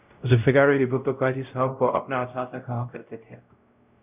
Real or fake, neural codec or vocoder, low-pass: fake; codec, 16 kHz, 0.5 kbps, X-Codec, WavLM features, trained on Multilingual LibriSpeech; 3.6 kHz